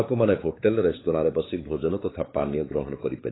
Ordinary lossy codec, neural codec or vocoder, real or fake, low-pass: AAC, 16 kbps; codec, 16 kHz, 4 kbps, X-Codec, WavLM features, trained on Multilingual LibriSpeech; fake; 7.2 kHz